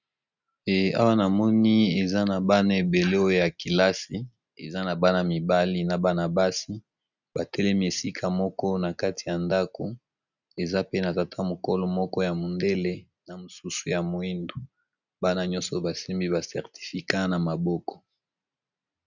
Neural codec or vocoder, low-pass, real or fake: none; 7.2 kHz; real